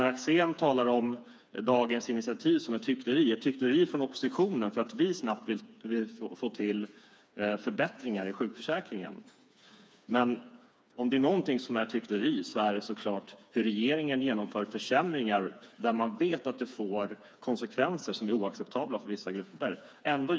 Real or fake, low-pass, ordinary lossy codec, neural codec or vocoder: fake; none; none; codec, 16 kHz, 4 kbps, FreqCodec, smaller model